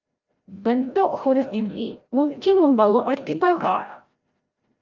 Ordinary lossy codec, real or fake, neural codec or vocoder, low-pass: Opus, 24 kbps; fake; codec, 16 kHz, 0.5 kbps, FreqCodec, larger model; 7.2 kHz